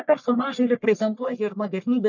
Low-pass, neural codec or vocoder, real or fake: 7.2 kHz; codec, 44.1 kHz, 1.7 kbps, Pupu-Codec; fake